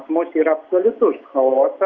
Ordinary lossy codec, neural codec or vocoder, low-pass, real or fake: Opus, 64 kbps; none; 7.2 kHz; real